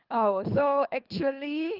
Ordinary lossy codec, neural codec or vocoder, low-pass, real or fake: Opus, 24 kbps; codec, 24 kHz, 3 kbps, HILCodec; 5.4 kHz; fake